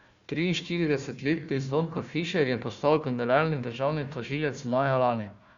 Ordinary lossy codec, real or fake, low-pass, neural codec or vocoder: Opus, 64 kbps; fake; 7.2 kHz; codec, 16 kHz, 1 kbps, FunCodec, trained on Chinese and English, 50 frames a second